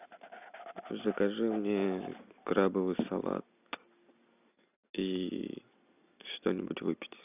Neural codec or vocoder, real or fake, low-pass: none; real; 3.6 kHz